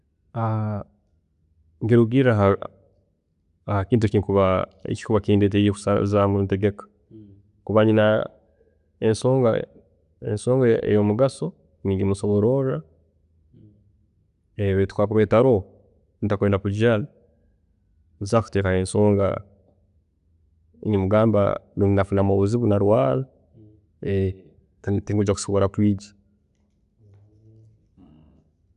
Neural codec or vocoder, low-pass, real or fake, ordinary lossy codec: none; 10.8 kHz; real; none